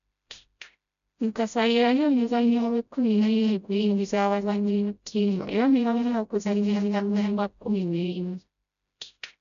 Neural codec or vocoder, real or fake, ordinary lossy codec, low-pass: codec, 16 kHz, 0.5 kbps, FreqCodec, smaller model; fake; none; 7.2 kHz